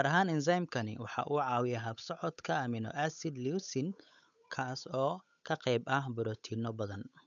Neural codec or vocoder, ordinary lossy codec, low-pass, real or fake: codec, 16 kHz, 8 kbps, FunCodec, trained on Chinese and English, 25 frames a second; none; 7.2 kHz; fake